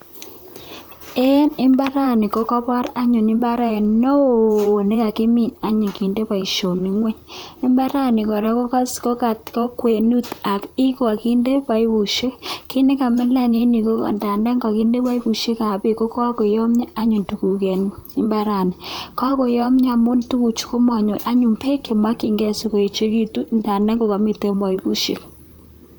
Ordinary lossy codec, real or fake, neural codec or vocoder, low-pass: none; fake; vocoder, 44.1 kHz, 128 mel bands, Pupu-Vocoder; none